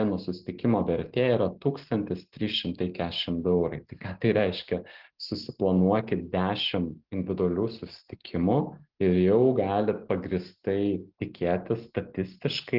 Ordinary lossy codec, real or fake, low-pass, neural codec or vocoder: Opus, 16 kbps; real; 5.4 kHz; none